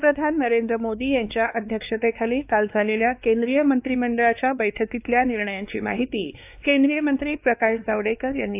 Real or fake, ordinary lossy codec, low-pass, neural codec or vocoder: fake; AAC, 32 kbps; 3.6 kHz; codec, 16 kHz, 2 kbps, X-Codec, WavLM features, trained on Multilingual LibriSpeech